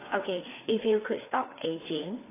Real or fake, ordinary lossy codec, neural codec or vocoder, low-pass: fake; AAC, 16 kbps; codec, 16 kHz, 4 kbps, FreqCodec, larger model; 3.6 kHz